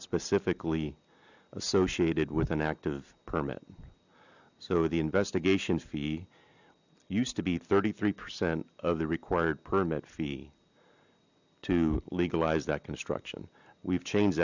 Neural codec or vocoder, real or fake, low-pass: none; real; 7.2 kHz